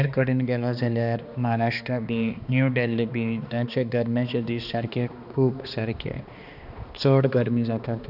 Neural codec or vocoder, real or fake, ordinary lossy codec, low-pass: codec, 16 kHz, 2 kbps, X-Codec, HuBERT features, trained on balanced general audio; fake; none; 5.4 kHz